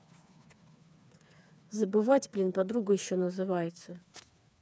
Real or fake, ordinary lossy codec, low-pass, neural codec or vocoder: fake; none; none; codec, 16 kHz, 4 kbps, FreqCodec, smaller model